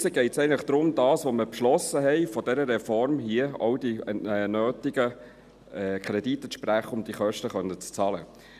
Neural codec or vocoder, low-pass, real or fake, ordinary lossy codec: none; 14.4 kHz; real; none